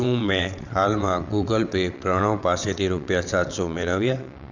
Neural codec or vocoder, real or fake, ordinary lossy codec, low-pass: vocoder, 22.05 kHz, 80 mel bands, WaveNeXt; fake; none; 7.2 kHz